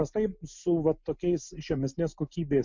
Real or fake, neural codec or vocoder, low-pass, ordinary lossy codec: real; none; 7.2 kHz; MP3, 64 kbps